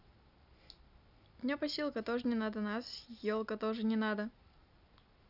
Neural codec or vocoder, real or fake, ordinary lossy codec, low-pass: none; real; none; 5.4 kHz